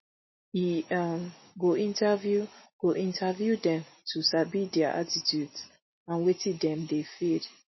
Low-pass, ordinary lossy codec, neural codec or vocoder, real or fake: 7.2 kHz; MP3, 24 kbps; none; real